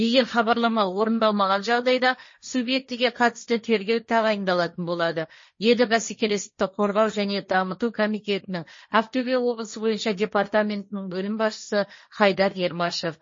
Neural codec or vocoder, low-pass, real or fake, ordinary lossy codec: codec, 16 kHz, 1.1 kbps, Voila-Tokenizer; 7.2 kHz; fake; MP3, 32 kbps